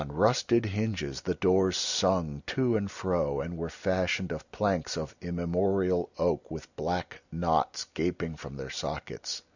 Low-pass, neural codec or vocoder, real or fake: 7.2 kHz; none; real